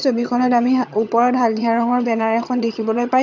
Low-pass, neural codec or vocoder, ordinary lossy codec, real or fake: 7.2 kHz; vocoder, 22.05 kHz, 80 mel bands, HiFi-GAN; none; fake